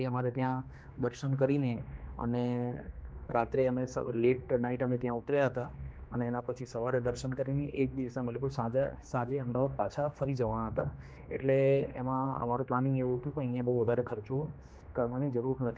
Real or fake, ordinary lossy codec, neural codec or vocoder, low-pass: fake; none; codec, 16 kHz, 2 kbps, X-Codec, HuBERT features, trained on general audio; none